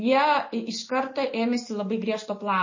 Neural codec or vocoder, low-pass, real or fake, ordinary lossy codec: none; 7.2 kHz; real; MP3, 32 kbps